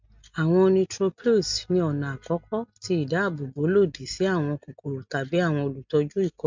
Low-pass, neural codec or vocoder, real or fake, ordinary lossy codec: 7.2 kHz; none; real; MP3, 64 kbps